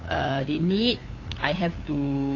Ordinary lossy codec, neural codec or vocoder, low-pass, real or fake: AAC, 32 kbps; codec, 16 kHz, 8 kbps, FunCodec, trained on LibriTTS, 25 frames a second; 7.2 kHz; fake